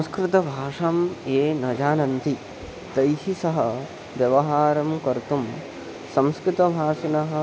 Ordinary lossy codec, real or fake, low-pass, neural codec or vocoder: none; real; none; none